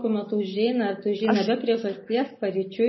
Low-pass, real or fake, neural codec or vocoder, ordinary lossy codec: 7.2 kHz; real; none; MP3, 24 kbps